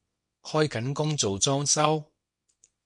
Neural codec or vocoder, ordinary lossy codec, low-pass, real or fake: codec, 24 kHz, 0.9 kbps, WavTokenizer, small release; MP3, 48 kbps; 10.8 kHz; fake